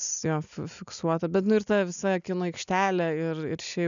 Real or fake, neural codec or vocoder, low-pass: real; none; 7.2 kHz